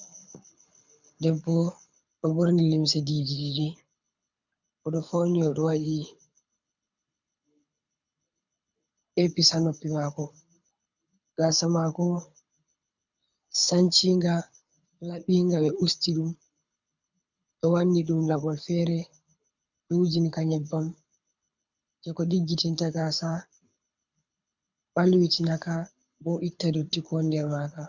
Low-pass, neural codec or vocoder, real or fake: 7.2 kHz; codec, 24 kHz, 6 kbps, HILCodec; fake